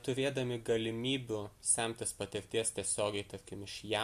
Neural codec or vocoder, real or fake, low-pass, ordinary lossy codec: none; real; 14.4 kHz; MP3, 64 kbps